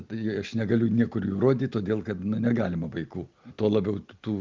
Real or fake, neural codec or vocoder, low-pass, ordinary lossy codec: real; none; 7.2 kHz; Opus, 24 kbps